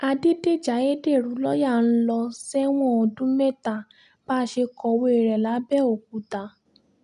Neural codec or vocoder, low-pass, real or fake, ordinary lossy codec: none; 10.8 kHz; real; none